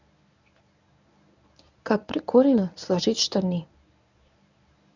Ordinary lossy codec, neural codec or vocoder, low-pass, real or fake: none; codec, 24 kHz, 0.9 kbps, WavTokenizer, medium speech release version 1; 7.2 kHz; fake